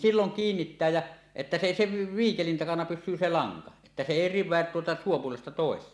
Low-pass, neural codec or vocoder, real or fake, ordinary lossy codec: none; none; real; none